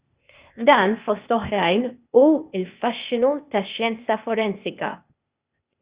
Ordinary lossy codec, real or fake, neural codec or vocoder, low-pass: Opus, 64 kbps; fake; codec, 16 kHz, 0.8 kbps, ZipCodec; 3.6 kHz